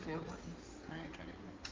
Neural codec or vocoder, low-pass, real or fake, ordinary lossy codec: codec, 16 kHz in and 24 kHz out, 2.2 kbps, FireRedTTS-2 codec; 7.2 kHz; fake; Opus, 32 kbps